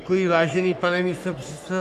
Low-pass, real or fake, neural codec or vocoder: 14.4 kHz; fake; codec, 44.1 kHz, 3.4 kbps, Pupu-Codec